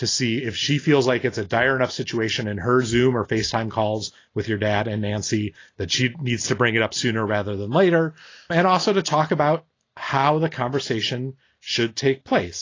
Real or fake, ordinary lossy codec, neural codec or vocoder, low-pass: real; AAC, 32 kbps; none; 7.2 kHz